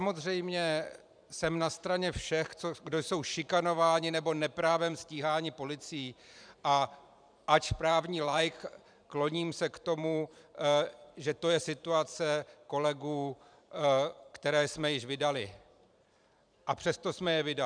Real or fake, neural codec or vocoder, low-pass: fake; vocoder, 44.1 kHz, 128 mel bands every 512 samples, BigVGAN v2; 9.9 kHz